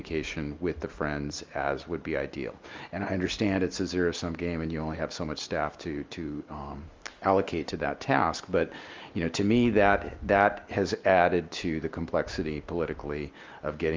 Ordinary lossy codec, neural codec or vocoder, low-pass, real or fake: Opus, 32 kbps; none; 7.2 kHz; real